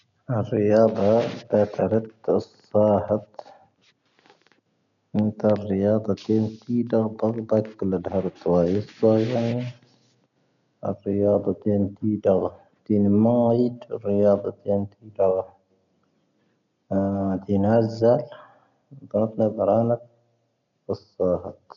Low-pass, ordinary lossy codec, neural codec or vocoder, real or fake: 7.2 kHz; none; none; real